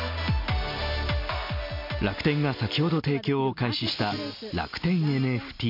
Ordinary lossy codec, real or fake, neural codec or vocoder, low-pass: MP3, 48 kbps; real; none; 5.4 kHz